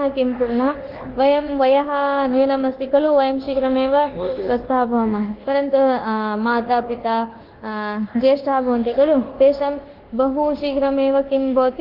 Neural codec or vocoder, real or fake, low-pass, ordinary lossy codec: codec, 24 kHz, 1.2 kbps, DualCodec; fake; 5.4 kHz; Opus, 16 kbps